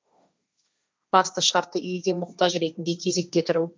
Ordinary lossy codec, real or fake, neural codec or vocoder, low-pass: none; fake; codec, 16 kHz, 1.1 kbps, Voila-Tokenizer; none